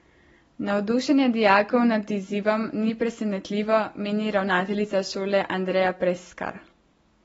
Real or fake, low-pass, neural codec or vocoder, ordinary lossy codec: real; 19.8 kHz; none; AAC, 24 kbps